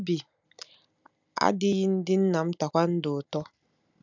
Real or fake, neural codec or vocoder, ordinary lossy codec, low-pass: real; none; none; 7.2 kHz